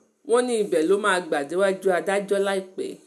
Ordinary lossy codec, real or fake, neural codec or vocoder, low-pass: MP3, 96 kbps; real; none; 14.4 kHz